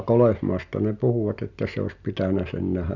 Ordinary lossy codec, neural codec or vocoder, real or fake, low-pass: none; none; real; 7.2 kHz